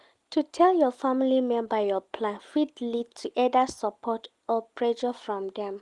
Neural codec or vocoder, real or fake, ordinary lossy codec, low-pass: none; real; Opus, 24 kbps; 10.8 kHz